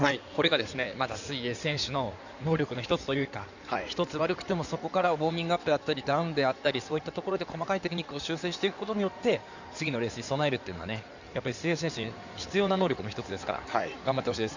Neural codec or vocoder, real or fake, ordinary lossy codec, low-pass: codec, 16 kHz in and 24 kHz out, 2.2 kbps, FireRedTTS-2 codec; fake; none; 7.2 kHz